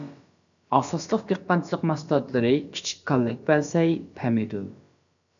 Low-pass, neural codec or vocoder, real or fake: 7.2 kHz; codec, 16 kHz, about 1 kbps, DyCAST, with the encoder's durations; fake